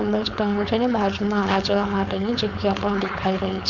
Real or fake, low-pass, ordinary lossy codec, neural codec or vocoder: fake; 7.2 kHz; none; codec, 16 kHz, 4.8 kbps, FACodec